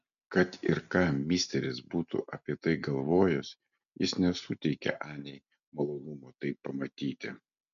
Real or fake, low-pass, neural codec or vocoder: real; 7.2 kHz; none